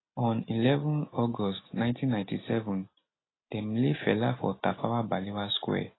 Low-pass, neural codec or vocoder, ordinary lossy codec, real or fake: 7.2 kHz; none; AAC, 16 kbps; real